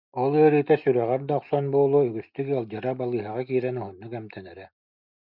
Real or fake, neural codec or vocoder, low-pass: real; none; 5.4 kHz